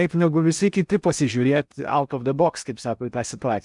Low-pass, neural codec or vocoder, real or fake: 10.8 kHz; codec, 16 kHz in and 24 kHz out, 0.8 kbps, FocalCodec, streaming, 65536 codes; fake